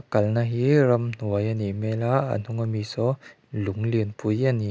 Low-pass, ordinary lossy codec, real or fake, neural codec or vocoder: none; none; real; none